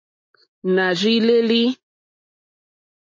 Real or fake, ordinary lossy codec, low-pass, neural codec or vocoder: real; MP3, 32 kbps; 7.2 kHz; none